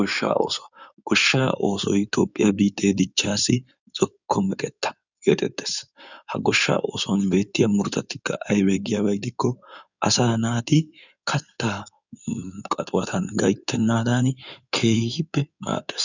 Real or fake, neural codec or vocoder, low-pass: fake; codec, 16 kHz in and 24 kHz out, 2.2 kbps, FireRedTTS-2 codec; 7.2 kHz